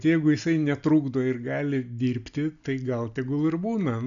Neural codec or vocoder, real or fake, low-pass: none; real; 7.2 kHz